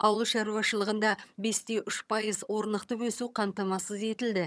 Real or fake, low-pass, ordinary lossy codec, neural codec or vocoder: fake; none; none; vocoder, 22.05 kHz, 80 mel bands, HiFi-GAN